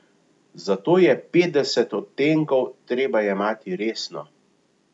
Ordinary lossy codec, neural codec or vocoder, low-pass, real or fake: none; none; 10.8 kHz; real